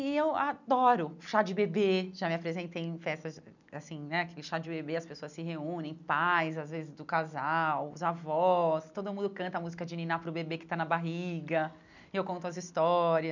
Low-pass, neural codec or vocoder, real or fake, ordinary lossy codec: 7.2 kHz; none; real; none